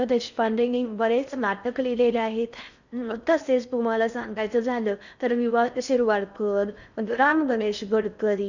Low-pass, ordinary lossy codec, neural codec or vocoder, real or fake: 7.2 kHz; none; codec, 16 kHz in and 24 kHz out, 0.6 kbps, FocalCodec, streaming, 4096 codes; fake